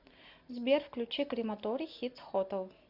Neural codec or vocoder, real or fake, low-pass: none; real; 5.4 kHz